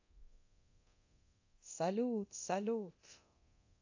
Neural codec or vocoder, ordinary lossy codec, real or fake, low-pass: codec, 24 kHz, 0.9 kbps, DualCodec; AAC, 48 kbps; fake; 7.2 kHz